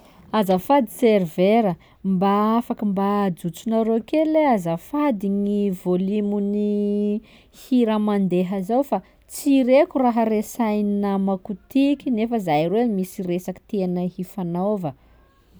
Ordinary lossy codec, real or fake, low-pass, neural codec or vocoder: none; real; none; none